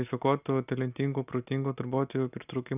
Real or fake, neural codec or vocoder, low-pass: real; none; 3.6 kHz